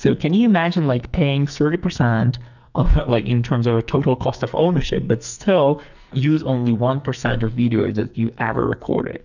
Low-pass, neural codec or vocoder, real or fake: 7.2 kHz; codec, 44.1 kHz, 2.6 kbps, SNAC; fake